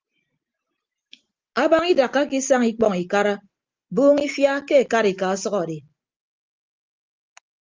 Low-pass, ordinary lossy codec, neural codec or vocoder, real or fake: 7.2 kHz; Opus, 32 kbps; none; real